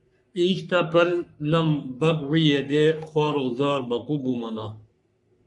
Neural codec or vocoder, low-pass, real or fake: codec, 44.1 kHz, 3.4 kbps, Pupu-Codec; 10.8 kHz; fake